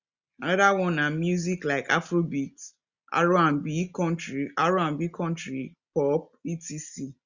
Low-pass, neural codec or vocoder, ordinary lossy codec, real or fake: 7.2 kHz; none; Opus, 64 kbps; real